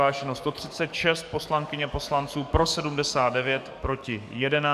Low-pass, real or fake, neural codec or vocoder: 14.4 kHz; fake; codec, 44.1 kHz, 7.8 kbps, DAC